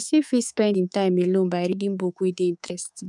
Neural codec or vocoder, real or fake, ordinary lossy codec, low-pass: codec, 24 kHz, 3.1 kbps, DualCodec; fake; none; 10.8 kHz